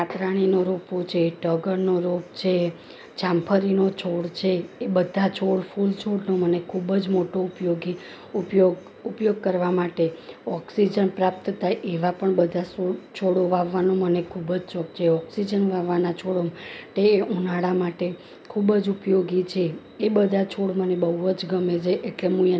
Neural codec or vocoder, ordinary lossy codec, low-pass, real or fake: none; none; none; real